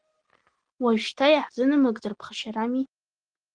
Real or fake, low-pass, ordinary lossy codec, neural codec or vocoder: real; 9.9 kHz; Opus, 16 kbps; none